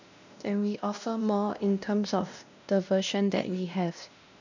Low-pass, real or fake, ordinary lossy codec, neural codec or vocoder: 7.2 kHz; fake; none; codec, 16 kHz, 1 kbps, X-Codec, WavLM features, trained on Multilingual LibriSpeech